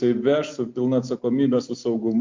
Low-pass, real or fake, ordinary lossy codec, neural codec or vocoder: 7.2 kHz; real; MP3, 48 kbps; none